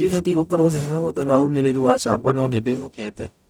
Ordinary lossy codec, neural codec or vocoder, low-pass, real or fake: none; codec, 44.1 kHz, 0.9 kbps, DAC; none; fake